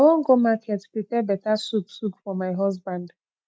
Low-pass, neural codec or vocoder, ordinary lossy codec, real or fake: none; none; none; real